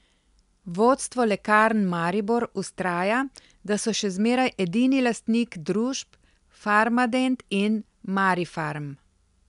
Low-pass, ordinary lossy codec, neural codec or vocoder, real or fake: 10.8 kHz; none; none; real